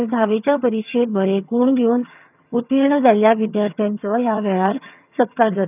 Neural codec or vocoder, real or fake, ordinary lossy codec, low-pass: vocoder, 22.05 kHz, 80 mel bands, HiFi-GAN; fake; none; 3.6 kHz